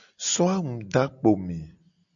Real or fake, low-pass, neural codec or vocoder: real; 7.2 kHz; none